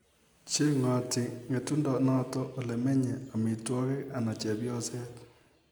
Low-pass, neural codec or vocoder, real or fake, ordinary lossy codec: none; none; real; none